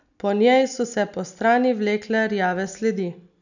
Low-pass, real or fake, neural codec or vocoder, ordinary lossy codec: 7.2 kHz; real; none; none